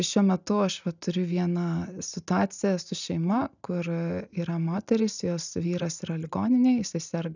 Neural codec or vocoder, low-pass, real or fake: none; 7.2 kHz; real